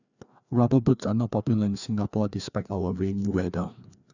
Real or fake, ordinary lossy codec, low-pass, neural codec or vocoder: fake; none; 7.2 kHz; codec, 16 kHz, 2 kbps, FreqCodec, larger model